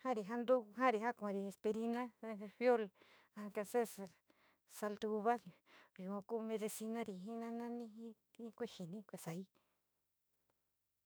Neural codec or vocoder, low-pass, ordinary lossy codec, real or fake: autoencoder, 48 kHz, 32 numbers a frame, DAC-VAE, trained on Japanese speech; none; none; fake